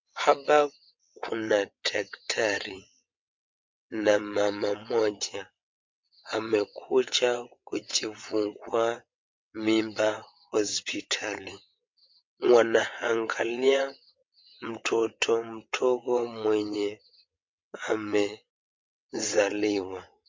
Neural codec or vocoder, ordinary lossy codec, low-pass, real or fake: vocoder, 22.05 kHz, 80 mel bands, WaveNeXt; MP3, 48 kbps; 7.2 kHz; fake